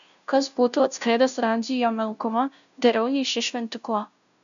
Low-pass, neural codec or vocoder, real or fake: 7.2 kHz; codec, 16 kHz, 0.5 kbps, FunCodec, trained on Chinese and English, 25 frames a second; fake